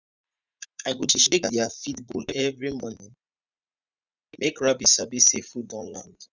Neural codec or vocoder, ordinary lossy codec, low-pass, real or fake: vocoder, 44.1 kHz, 80 mel bands, Vocos; none; 7.2 kHz; fake